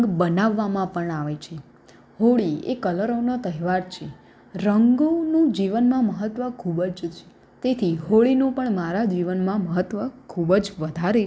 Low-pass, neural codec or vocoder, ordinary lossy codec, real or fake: none; none; none; real